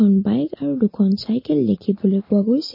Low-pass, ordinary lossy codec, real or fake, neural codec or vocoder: 5.4 kHz; MP3, 24 kbps; real; none